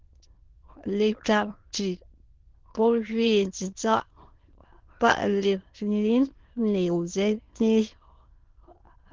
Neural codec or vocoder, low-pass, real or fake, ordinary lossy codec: autoencoder, 22.05 kHz, a latent of 192 numbers a frame, VITS, trained on many speakers; 7.2 kHz; fake; Opus, 16 kbps